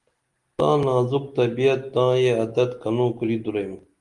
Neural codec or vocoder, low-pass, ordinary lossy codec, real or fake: none; 10.8 kHz; Opus, 24 kbps; real